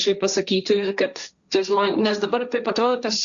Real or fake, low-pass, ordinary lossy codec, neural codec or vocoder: fake; 7.2 kHz; Opus, 64 kbps; codec, 16 kHz, 1.1 kbps, Voila-Tokenizer